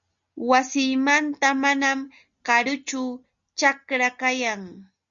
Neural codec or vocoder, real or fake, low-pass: none; real; 7.2 kHz